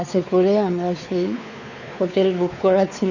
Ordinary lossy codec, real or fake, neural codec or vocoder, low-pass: none; fake; codec, 16 kHz, 4 kbps, X-Codec, WavLM features, trained on Multilingual LibriSpeech; 7.2 kHz